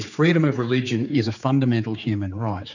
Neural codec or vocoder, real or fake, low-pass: codec, 16 kHz, 4 kbps, X-Codec, HuBERT features, trained on general audio; fake; 7.2 kHz